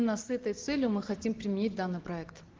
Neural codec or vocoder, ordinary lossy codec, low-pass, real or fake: none; Opus, 16 kbps; 7.2 kHz; real